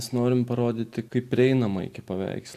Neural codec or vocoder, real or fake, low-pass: none; real; 14.4 kHz